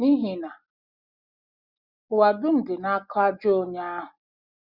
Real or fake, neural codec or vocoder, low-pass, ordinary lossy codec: real; none; 5.4 kHz; Opus, 64 kbps